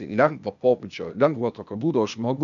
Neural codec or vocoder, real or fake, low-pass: codec, 16 kHz, 0.8 kbps, ZipCodec; fake; 7.2 kHz